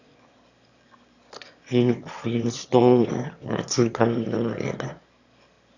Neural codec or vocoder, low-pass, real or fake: autoencoder, 22.05 kHz, a latent of 192 numbers a frame, VITS, trained on one speaker; 7.2 kHz; fake